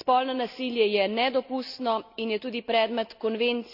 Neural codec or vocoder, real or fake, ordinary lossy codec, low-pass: none; real; none; 5.4 kHz